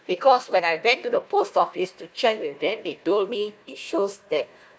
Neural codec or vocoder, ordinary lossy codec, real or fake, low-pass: codec, 16 kHz, 1 kbps, FunCodec, trained on Chinese and English, 50 frames a second; none; fake; none